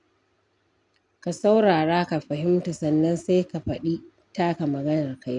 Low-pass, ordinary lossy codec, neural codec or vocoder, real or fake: 10.8 kHz; none; none; real